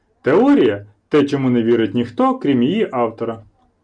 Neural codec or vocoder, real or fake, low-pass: none; real; 9.9 kHz